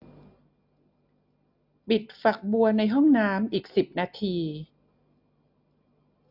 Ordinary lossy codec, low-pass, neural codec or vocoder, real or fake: AAC, 48 kbps; 5.4 kHz; none; real